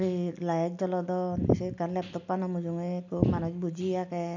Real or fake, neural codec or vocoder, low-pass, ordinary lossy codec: real; none; 7.2 kHz; none